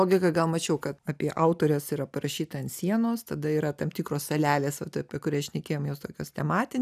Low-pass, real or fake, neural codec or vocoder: 14.4 kHz; real; none